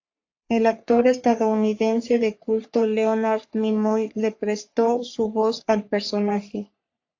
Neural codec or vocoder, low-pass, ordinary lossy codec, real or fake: codec, 44.1 kHz, 3.4 kbps, Pupu-Codec; 7.2 kHz; AAC, 48 kbps; fake